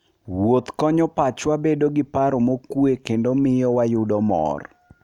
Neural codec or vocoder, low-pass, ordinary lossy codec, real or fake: none; 19.8 kHz; none; real